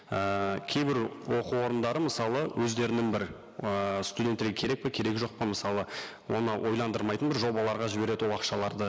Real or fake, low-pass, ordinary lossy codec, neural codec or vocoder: real; none; none; none